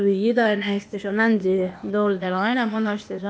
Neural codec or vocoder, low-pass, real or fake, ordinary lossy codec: codec, 16 kHz, 0.8 kbps, ZipCodec; none; fake; none